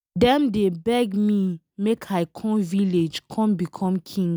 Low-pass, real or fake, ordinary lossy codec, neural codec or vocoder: none; real; none; none